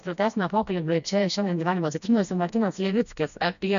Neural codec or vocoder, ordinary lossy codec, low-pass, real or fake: codec, 16 kHz, 1 kbps, FreqCodec, smaller model; MP3, 96 kbps; 7.2 kHz; fake